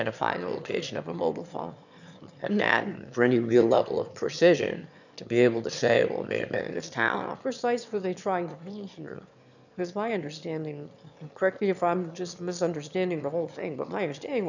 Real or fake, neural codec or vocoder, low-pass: fake; autoencoder, 22.05 kHz, a latent of 192 numbers a frame, VITS, trained on one speaker; 7.2 kHz